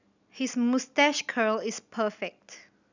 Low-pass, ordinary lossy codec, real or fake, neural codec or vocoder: 7.2 kHz; none; real; none